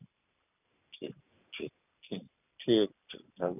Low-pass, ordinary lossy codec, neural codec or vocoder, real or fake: 3.6 kHz; none; none; real